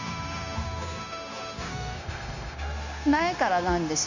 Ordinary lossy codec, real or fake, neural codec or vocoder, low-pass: none; fake; codec, 16 kHz, 0.9 kbps, LongCat-Audio-Codec; 7.2 kHz